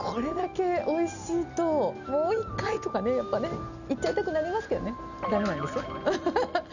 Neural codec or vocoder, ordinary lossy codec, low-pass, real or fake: none; none; 7.2 kHz; real